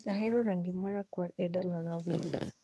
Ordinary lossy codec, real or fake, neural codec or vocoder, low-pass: none; fake; codec, 24 kHz, 1 kbps, SNAC; none